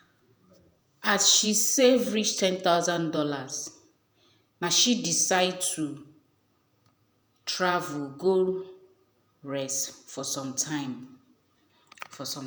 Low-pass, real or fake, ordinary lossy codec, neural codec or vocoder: none; fake; none; vocoder, 48 kHz, 128 mel bands, Vocos